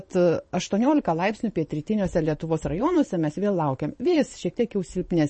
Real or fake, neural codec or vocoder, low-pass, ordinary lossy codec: fake; vocoder, 44.1 kHz, 128 mel bands every 512 samples, BigVGAN v2; 10.8 kHz; MP3, 32 kbps